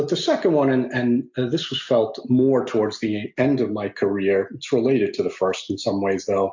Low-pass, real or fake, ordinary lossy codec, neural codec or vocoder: 7.2 kHz; real; MP3, 64 kbps; none